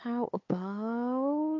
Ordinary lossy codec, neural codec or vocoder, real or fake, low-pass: MP3, 48 kbps; codec, 16 kHz, 8 kbps, FunCodec, trained on LibriTTS, 25 frames a second; fake; 7.2 kHz